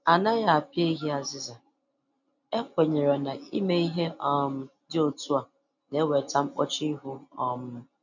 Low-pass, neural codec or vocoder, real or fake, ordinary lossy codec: 7.2 kHz; none; real; none